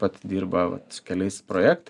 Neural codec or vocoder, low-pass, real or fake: none; 10.8 kHz; real